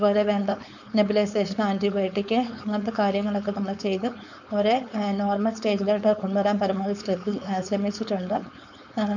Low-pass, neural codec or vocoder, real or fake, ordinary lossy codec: 7.2 kHz; codec, 16 kHz, 4.8 kbps, FACodec; fake; none